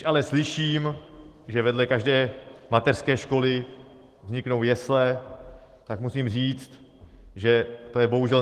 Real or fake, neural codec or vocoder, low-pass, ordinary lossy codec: fake; autoencoder, 48 kHz, 128 numbers a frame, DAC-VAE, trained on Japanese speech; 14.4 kHz; Opus, 16 kbps